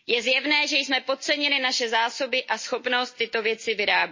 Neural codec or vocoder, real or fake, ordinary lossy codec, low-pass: none; real; MP3, 32 kbps; 7.2 kHz